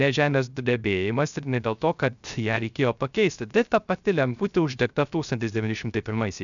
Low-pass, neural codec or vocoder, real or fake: 7.2 kHz; codec, 16 kHz, 0.3 kbps, FocalCodec; fake